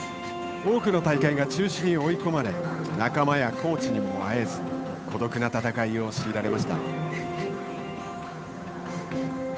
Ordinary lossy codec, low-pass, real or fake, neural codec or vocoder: none; none; fake; codec, 16 kHz, 8 kbps, FunCodec, trained on Chinese and English, 25 frames a second